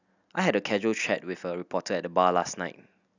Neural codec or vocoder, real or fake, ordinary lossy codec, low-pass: none; real; none; 7.2 kHz